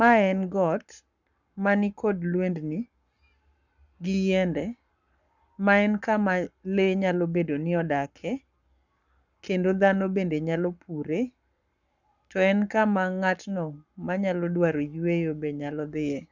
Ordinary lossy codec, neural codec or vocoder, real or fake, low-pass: none; codec, 44.1 kHz, 7.8 kbps, Pupu-Codec; fake; 7.2 kHz